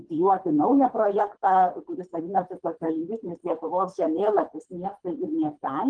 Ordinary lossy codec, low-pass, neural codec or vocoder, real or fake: Opus, 24 kbps; 9.9 kHz; codec, 24 kHz, 3 kbps, HILCodec; fake